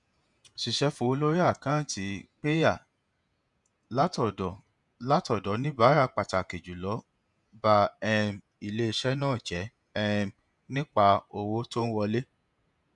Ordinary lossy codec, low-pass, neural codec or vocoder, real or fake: none; 10.8 kHz; vocoder, 48 kHz, 128 mel bands, Vocos; fake